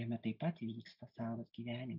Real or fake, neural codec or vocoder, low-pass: real; none; 5.4 kHz